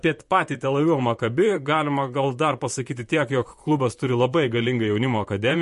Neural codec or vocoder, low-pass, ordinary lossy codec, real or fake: vocoder, 44.1 kHz, 128 mel bands, Pupu-Vocoder; 14.4 kHz; MP3, 48 kbps; fake